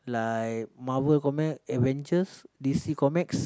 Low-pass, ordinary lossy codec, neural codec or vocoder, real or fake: none; none; none; real